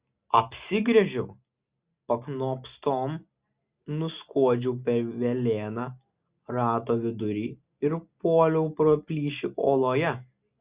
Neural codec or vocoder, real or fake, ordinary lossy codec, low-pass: none; real; Opus, 64 kbps; 3.6 kHz